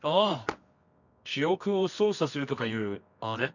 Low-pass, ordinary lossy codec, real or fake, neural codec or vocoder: 7.2 kHz; none; fake; codec, 24 kHz, 0.9 kbps, WavTokenizer, medium music audio release